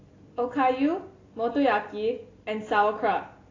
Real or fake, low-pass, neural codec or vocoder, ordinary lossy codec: real; 7.2 kHz; none; AAC, 32 kbps